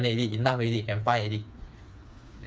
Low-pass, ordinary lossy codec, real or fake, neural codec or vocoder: none; none; fake; codec, 16 kHz, 4 kbps, FreqCodec, smaller model